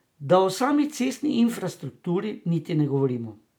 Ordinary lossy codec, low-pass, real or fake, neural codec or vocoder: none; none; real; none